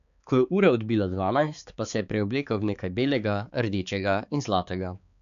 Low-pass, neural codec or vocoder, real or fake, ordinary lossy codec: 7.2 kHz; codec, 16 kHz, 4 kbps, X-Codec, HuBERT features, trained on balanced general audio; fake; none